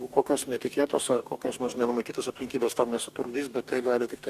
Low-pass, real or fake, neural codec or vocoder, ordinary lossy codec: 14.4 kHz; fake; codec, 44.1 kHz, 2.6 kbps, DAC; Opus, 64 kbps